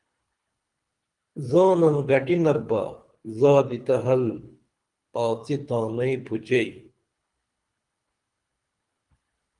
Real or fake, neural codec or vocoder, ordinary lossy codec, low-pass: fake; codec, 24 kHz, 3 kbps, HILCodec; Opus, 32 kbps; 10.8 kHz